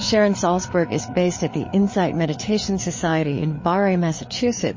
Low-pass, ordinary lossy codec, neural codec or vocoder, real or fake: 7.2 kHz; MP3, 32 kbps; codec, 16 kHz, 4 kbps, FreqCodec, larger model; fake